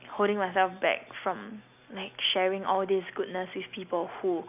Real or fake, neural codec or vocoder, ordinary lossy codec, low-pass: real; none; none; 3.6 kHz